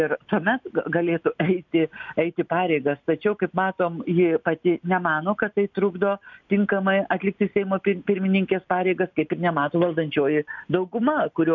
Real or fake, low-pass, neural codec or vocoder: real; 7.2 kHz; none